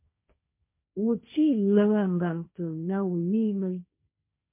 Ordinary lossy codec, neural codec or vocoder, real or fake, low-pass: MP3, 24 kbps; codec, 16 kHz, 1.1 kbps, Voila-Tokenizer; fake; 3.6 kHz